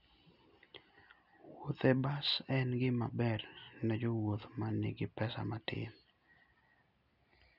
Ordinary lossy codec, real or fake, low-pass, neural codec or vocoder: none; real; 5.4 kHz; none